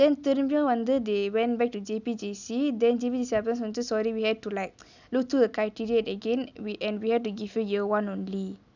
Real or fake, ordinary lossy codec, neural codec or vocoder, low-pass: real; none; none; 7.2 kHz